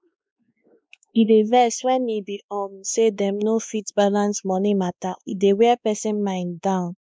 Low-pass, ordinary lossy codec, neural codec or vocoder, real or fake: none; none; codec, 16 kHz, 2 kbps, X-Codec, WavLM features, trained on Multilingual LibriSpeech; fake